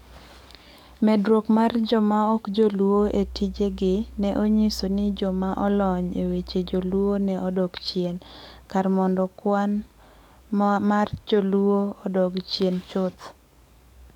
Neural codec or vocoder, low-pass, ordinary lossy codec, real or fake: codec, 44.1 kHz, 7.8 kbps, DAC; 19.8 kHz; none; fake